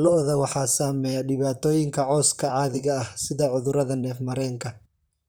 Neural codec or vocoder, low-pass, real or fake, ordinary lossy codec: vocoder, 44.1 kHz, 128 mel bands, Pupu-Vocoder; none; fake; none